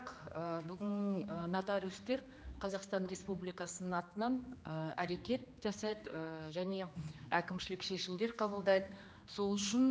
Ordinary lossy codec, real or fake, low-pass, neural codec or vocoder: none; fake; none; codec, 16 kHz, 2 kbps, X-Codec, HuBERT features, trained on general audio